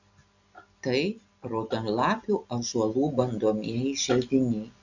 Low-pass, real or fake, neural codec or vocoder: 7.2 kHz; real; none